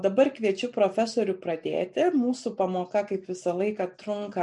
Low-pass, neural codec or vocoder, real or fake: 10.8 kHz; none; real